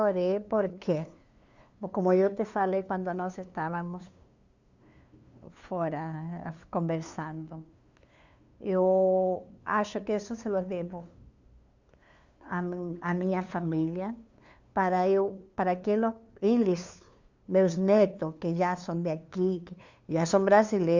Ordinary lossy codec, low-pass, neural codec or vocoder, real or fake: Opus, 64 kbps; 7.2 kHz; codec, 16 kHz, 2 kbps, FunCodec, trained on LibriTTS, 25 frames a second; fake